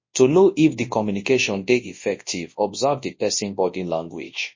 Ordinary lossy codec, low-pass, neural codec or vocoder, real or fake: MP3, 32 kbps; 7.2 kHz; codec, 24 kHz, 0.9 kbps, WavTokenizer, large speech release; fake